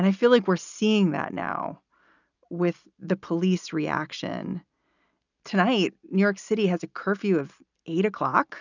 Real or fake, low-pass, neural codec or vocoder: real; 7.2 kHz; none